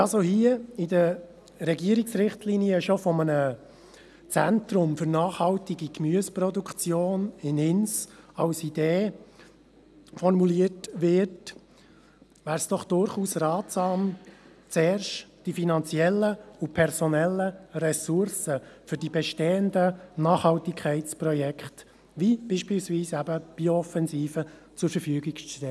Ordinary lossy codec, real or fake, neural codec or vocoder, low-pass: none; real; none; none